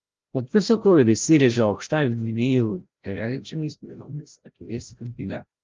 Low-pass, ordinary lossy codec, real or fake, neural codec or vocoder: 7.2 kHz; Opus, 32 kbps; fake; codec, 16 kHz, 0.5 kbps, FreqCodec, larger model